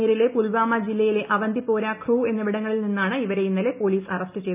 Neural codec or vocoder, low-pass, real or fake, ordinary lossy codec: none; 3.6 kHz; real; none